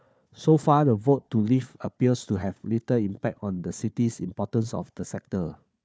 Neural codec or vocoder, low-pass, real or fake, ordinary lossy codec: codec, 16 kHz, 4 kbps, FunCodec, trained on Chinese and English, 50 frames a second; none; fake; none